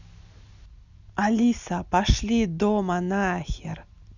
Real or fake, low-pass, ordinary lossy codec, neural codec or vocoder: real; 7.2 kHz; none; none